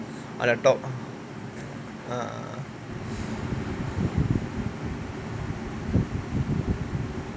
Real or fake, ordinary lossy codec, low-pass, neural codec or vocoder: real; none; none; none